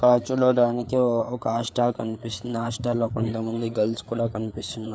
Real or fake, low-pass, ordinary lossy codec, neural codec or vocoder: fake; none; none; codec, 16 kHz, 4 kbps, FreqCodec, larger model